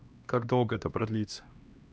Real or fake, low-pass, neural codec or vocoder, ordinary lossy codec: fake; none; codec, 16 kHz, 1 kbps, X-Codec, HuBERT features, trained on LibriSpeech; none